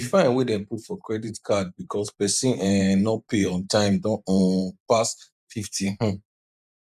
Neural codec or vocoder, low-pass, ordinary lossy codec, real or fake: vocoder, 44.1 kHz, 128 mel bands every 512 samples, BigVGAN v2; 14.4 kHz; none; fake